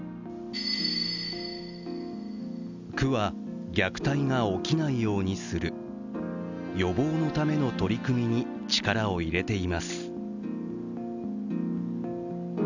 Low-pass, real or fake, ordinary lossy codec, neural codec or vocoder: 7.2 kHz; real; none; none